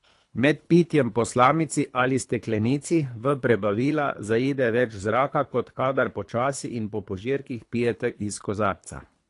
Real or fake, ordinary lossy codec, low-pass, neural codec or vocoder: fake; AAC, 48 kbps; 10.8 kHz; codec, 24 kHz, 3 kbps, HILCodec